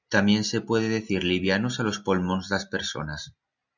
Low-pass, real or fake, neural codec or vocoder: 7.2 kHz; real; none